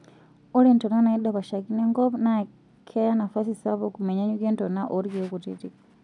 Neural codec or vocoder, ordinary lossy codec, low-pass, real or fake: none; none; 10.8 kHz; real